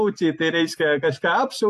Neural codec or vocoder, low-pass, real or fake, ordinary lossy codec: vocoder, 44.1 kHz, 128 mel bands every 256 samples, BigVGAN v2; 14.4 kHz; fake; AAC, 64 kbps